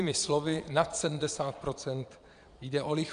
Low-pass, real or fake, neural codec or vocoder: 9.9 kHz; fake; vocoder, 22.05 kHz, 80 mel bands, Vocos